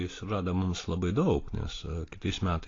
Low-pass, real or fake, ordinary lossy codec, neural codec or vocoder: 7.2 kHz; real; AAC, 32 kbps; none